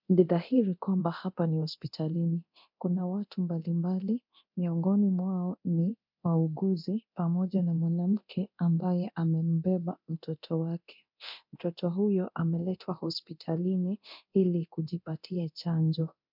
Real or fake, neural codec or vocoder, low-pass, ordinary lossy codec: fake; codec, 24 kHz, 0.9 kbps, DualCodec; 5.4 kHz; MP3, 48 kbps